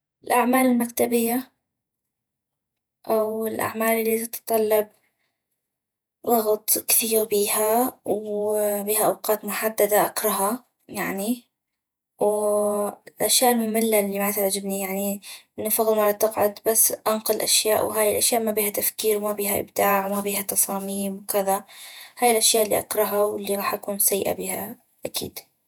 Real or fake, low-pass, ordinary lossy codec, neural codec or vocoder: fake; none; none; vocoder, 48 kHz, 128 mel bands, Vocos